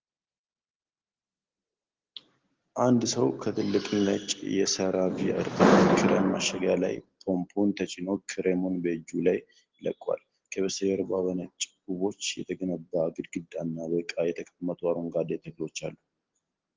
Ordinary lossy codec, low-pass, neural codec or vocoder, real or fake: Opus, 16 kbps; 7.2 kHz; none; real